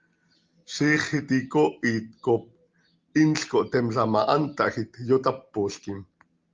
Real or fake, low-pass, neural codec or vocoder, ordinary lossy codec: real; 7.2 kHz; none; Opus, 32 kbps